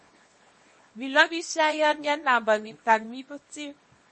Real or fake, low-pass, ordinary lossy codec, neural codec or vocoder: fake; 10.8 kHz; MP3, 32 kbps; codec, 24 kHz, 0.9 kbps, WavTokenizer, small release